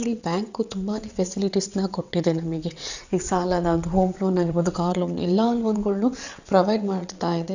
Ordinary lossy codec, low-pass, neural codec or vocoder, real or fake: none; 7.2 kHz; vocoder, 22.05 kHz, 80 mel bands, WaveNeXt; fake